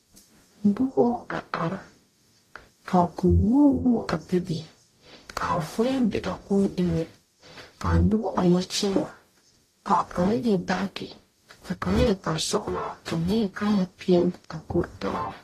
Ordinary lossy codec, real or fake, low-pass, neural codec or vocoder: AAC, 48 kbps; fake; 14.4 kHz; codec, 44.1 kHz, 0.9 kbps, DAC